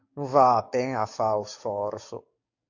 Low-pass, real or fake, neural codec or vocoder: 7.2 kHz; fake; codec, 44.1 kHz, 7.8 kbps, DAC